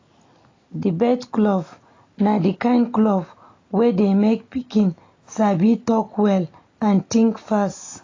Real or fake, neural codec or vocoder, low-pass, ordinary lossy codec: real; none; 7.2 kHz; AAC, 32 kbps